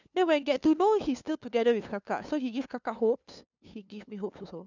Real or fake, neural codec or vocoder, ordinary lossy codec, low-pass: fake; codec, 16 kHz, 2 kbps, FunCodec, trained on LibriTTS, 25 frames a second; none; 7.2 kHz